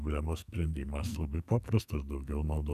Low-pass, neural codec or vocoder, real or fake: 14.4 kHz; codec, 44.1 kHz, 2.6 kbps, SNAC; fake